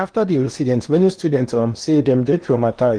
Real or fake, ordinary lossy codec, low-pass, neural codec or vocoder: fake; Opus, 24 kbps; 9.9 kHz; codec, 16 kHz in and 24 kHz out, 0.8 kbps, FocalCodec, streaming, 65536 codes